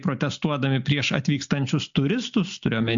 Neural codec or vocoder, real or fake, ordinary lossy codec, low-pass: none; real; MP3, 64 kbps; 7.2 kHz